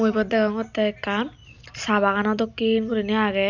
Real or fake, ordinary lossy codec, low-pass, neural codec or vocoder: real; none; 7.2 kHz; none